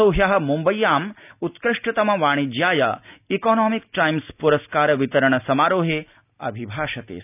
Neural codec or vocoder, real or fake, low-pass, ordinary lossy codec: none; real; 3.6 kHz; none